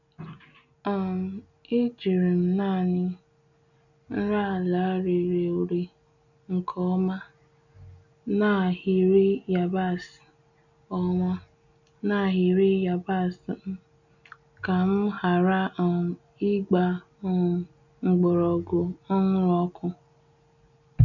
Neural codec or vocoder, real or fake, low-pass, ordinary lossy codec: none; real; 7.2 kHz; none